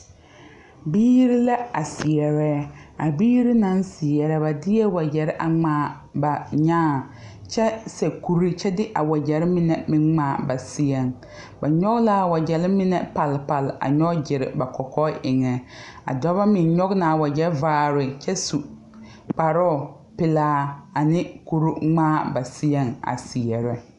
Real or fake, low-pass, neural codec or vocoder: real; 10.8 kHz; none